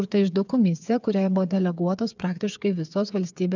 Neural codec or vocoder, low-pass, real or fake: codec, 16 kHz, 8 kbps, FreqCodec, smaller model; 7.2 kHz; fake